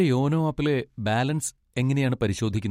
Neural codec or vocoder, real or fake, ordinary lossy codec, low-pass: none; real; MP3, 64 kbps; 19.8 kHz